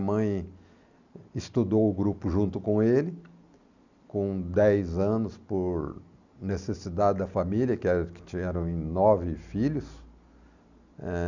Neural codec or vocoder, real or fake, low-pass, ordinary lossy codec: none; real; 7.2 kHz; none